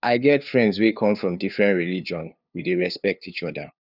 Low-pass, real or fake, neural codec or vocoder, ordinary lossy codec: 5.4 kHz; fake; codec, 16 kHz, 2 kbps, FunCodec, trained on LibriTTS, 25 frames a second; none